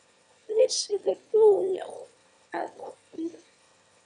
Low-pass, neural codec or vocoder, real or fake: 9.9 kHz; autoencoder, 22.05 kHz, a latent of 192 numbers a frame, VITS, trained on one speaker; fake